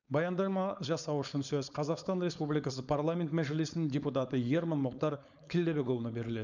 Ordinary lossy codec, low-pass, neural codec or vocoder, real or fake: none; 7.2 kHz; codec, 16 kHz, 4.8 kbps, FACodec; fake